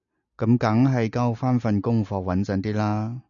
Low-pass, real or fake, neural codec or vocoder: 7.2 kHz; real; none